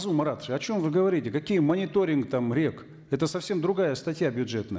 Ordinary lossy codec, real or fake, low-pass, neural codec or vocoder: none; real; none; none